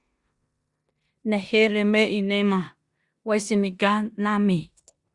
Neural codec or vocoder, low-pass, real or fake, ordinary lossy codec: codec, 16 kHz in and 24 kHz out, 0.9 kbps, LongCat-Audio-Codec, four codebook decoder; 10.8 kHz; fake; Opus, 64 kbps